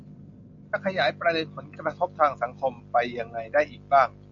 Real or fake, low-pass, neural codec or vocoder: real; 7.2 kHz; none